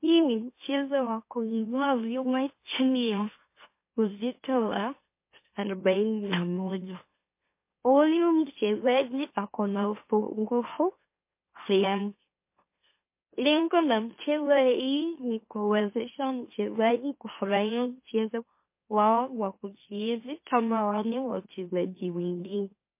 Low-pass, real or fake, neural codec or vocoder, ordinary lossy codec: 3.6 kHz; fake; autoencoder, 44.1 kHz, a latent of 192 numbers a frame, MeloTTS; MP3, 24 kbps